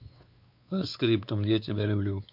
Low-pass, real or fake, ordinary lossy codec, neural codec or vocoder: 5.4 kHz; fake; MP3, 48 kbps; codec, 16 kHz, 4 kbps, X-Codec, WavLM features, trained on Multilingual LibriSpeech